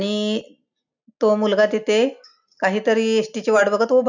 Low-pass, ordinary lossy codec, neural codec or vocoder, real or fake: 7.2 kHz; none; none; real